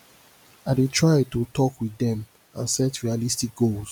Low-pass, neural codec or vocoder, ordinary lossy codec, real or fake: none; none; none; real